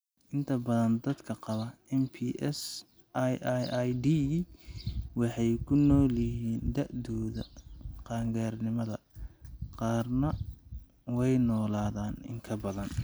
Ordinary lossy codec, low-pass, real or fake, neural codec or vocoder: none; none; real; none